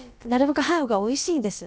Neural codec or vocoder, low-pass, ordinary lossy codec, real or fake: codec, 16 kHz, about 1 kbps, DyCAST, with the encoder's durations; none; none; fake